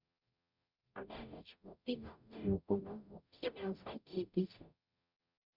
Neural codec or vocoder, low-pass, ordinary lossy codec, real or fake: codec, 44.1 kHz, 0.9 kbps, DAC; 5.4 kHz; none; fake